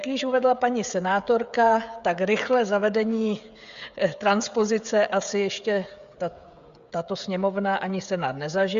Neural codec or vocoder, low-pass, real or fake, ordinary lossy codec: codec, 16 kHz, 16 kbps, FreqCodec, smaller model; 7.2 kHz; fake; Opus, 64 kbps